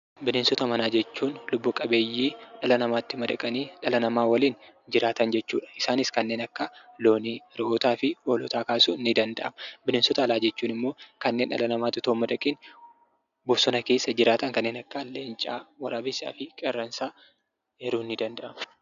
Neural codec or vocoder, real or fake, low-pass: none; real; 7.2 kHz